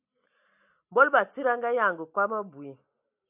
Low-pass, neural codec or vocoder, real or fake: 3.6 kHz; none; real